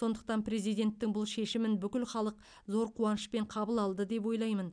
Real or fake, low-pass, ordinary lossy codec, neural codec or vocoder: real; 9.9 kHz; none; none